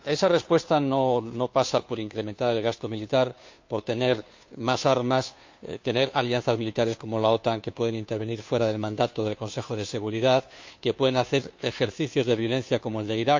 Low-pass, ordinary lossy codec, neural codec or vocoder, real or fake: 7.2 kHz; MP3, 48 kbps; codec, 16 kHz, 2 kbps, FunCodec, trained on Chinese and English, 25 frames a second; fake